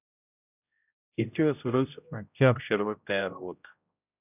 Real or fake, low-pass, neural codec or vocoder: fake; 3.6 kHz; codec, 16 kHz, 0.5 kbps, X-Codec, HuBERT features, trained on general audio